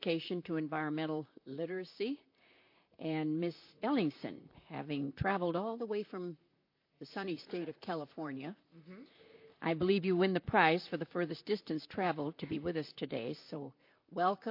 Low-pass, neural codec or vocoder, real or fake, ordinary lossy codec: 5.4 kHz; vocoder, 44.1 kHz, 128 mel bands, Pupu-Vocoder; fake; MP3, 32 kbps